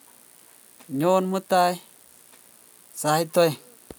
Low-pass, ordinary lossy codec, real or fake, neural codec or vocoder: none; none; real; none